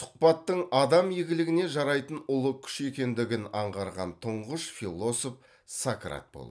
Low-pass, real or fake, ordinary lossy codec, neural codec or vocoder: none; real; none; none